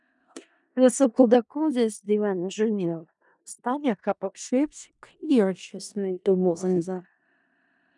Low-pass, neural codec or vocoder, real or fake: 10.8 kHz; codec, 16 kHz in and 24 kHz out, 0.4 kbps, LongCat-Audio-Codec, four codebook decoder; fake